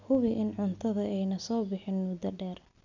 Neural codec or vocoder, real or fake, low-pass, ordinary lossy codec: none; real; 7.2 kHz; none